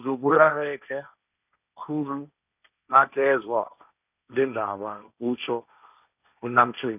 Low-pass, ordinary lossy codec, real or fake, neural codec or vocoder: 3.6 kHz; none; fake; codec, 16 kHz, 1.1 kbps, Voila-Tokenizer